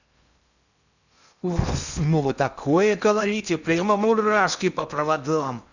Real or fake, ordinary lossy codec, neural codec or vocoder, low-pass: fake; none; codec, 16 kHz in and 24 kHz out, 0.6 kbps, FocalCodec, streaming, 4096 codes; 7.2 kHz